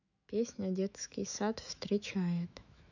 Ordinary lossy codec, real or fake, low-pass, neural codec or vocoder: none; fake; 7.2 kHz; autoencoder, 48 kHz, 128 numbers a frame, DAC-VAE, trained on Japanese speech